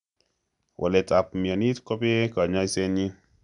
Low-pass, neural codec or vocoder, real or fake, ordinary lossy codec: 10.8 kHz; none; real; MP3, 96 kbps